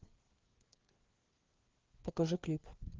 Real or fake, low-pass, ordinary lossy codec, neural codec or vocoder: fake; 7.2 kHz; Opus, 16 kbps; codec, 16 kHz, 4 kbps, FreqCodec, larger model